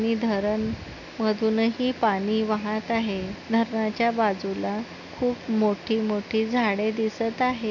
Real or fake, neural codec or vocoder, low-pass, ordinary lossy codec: real; none; 7.2 kHz; none